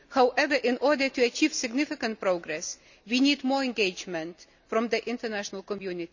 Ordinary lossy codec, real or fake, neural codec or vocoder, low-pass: none; real; none; 7.2 kHz